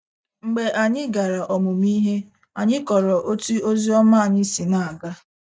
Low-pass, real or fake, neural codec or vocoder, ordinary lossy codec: none; real; none; none